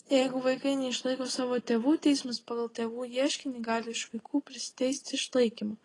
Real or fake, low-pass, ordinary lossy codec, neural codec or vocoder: real; 10.8 kHz; AAC, 32 kbps; none